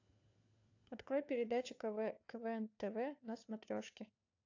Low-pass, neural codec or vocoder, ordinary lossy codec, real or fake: 7.2 kHz; codec, 16 kHz, 4 kbps, FunCodec, trained on LibriTTS, 50 frames a second; AAC, 48 kbps; fake